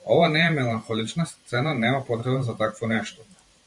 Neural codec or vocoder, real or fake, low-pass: vocoder, 44.1 kHz, 128 mel bands every 512 samples, BigVGAN v2; fake; 10.8 kHz